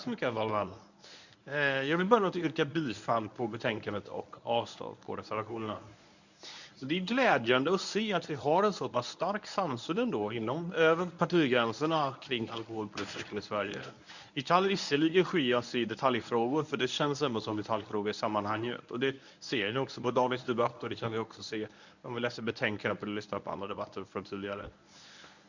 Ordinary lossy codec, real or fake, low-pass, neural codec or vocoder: none; fake; 7.2 kHz; codec, 24 kHz, 0.9 kbps, WavTokenizer, medium speech release version 1